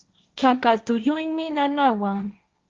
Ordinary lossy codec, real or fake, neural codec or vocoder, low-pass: Opus, 32 kbps; fake; codec, 16 kHz, 1.1 kbps, Voila-Tokenizer; 7.2 kHz